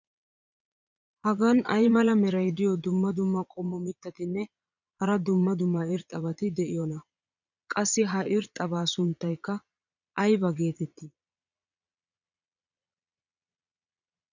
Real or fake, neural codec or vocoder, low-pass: fake; vocoder, 22.05 kHz, 80 mel bands, Vocos; 7.2 kHz